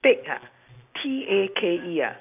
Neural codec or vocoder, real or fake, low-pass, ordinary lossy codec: none; real; 3.6 kHz; none